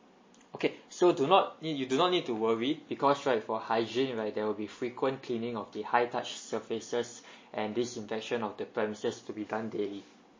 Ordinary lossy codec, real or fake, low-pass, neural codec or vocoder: MP3, 32 kbps; real; 7.2 kHz; none